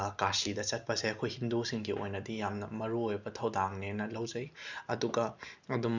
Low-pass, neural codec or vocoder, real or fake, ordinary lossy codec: 7.2 kHz; none; real; none